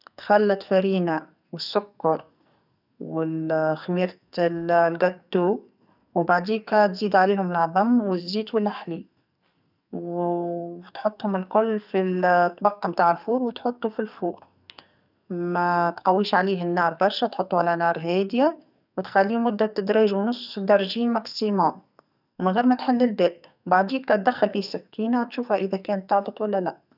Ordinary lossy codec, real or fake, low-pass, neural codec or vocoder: none; fake; 5.4 kHz; codec, 44.1 kHz, 2.6 kbps, SNAC